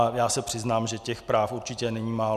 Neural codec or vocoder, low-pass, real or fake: none; 14.4 kHz; real